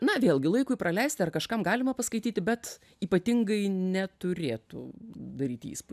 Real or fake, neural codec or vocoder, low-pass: real; none; 14.4 kHz